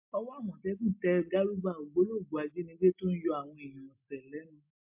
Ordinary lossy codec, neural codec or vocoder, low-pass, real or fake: MP3, 32 kbps; none; 3.6 kHz; real